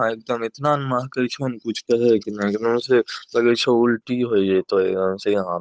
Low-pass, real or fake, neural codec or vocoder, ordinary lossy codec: none; fake; codec, 16 kHz, 8 kbps, FunCodec, trained on Chinese and English, 25 frames a second; none